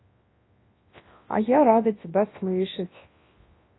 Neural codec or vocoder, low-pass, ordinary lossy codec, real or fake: codec, 24 kHz, 0.9 kbps, WavTokenizer, large speech release; 7.2 kHz; AAC, 16 kbps; fake